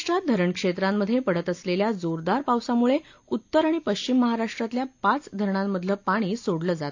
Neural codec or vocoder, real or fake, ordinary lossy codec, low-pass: none; real; AAC, 48 kbps; 7.2 kHz